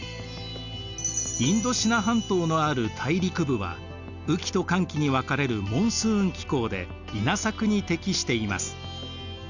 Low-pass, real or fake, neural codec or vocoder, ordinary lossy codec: 7.2 kHz; real; none; none